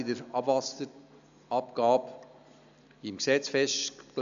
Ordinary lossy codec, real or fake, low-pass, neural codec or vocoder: AAC, 96 kbps; real; 7.2 kHz; none